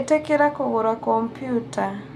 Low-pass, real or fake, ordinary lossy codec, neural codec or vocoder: 14.4 kHz; real; none; none